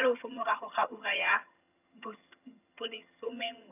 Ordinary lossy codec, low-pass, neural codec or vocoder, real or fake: none; 3.6 kHz; vocoder, 22.05 kHz, 80 mel bands, HiFi-GAN; fake